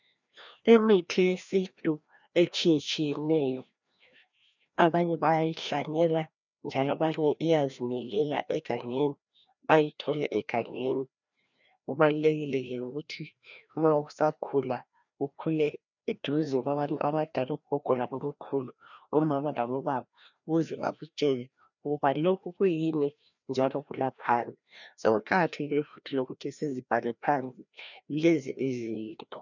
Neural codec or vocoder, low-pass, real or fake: codec, 16 kHz, 1 kbps, FreqCodec, larger model; 7.2 kHz; fake